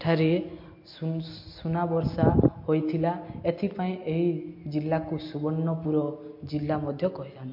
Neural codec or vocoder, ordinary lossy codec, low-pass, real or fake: none; MP3, 32 kbps; 5.4 kHz; real